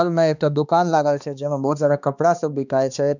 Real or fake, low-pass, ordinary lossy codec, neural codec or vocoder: fake; 7.2 kHz; none; codec, 16 kHz, 2 kbps, X-Codec, HuBERT features, trained on balanced general audio